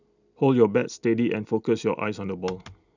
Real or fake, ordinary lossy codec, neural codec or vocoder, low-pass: real; none; none; 7.2 kHz